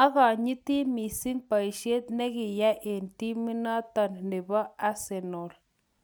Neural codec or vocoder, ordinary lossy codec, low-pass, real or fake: none; none; none; real